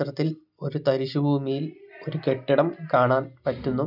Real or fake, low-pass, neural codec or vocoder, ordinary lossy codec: real; 5.4 kHz; none; none